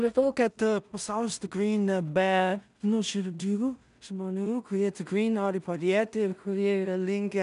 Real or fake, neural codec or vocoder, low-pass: fake; codec, 16 kHz in and 24 kHz out, 0.4 kbps, LongCat-Audio-Codec, two codebook decoder; 10.8 kHz